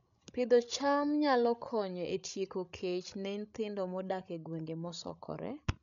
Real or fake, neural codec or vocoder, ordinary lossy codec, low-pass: fake; codec, 16 kHz, 16 kbps, FreqCodec, larger model; none; 7.2 kHz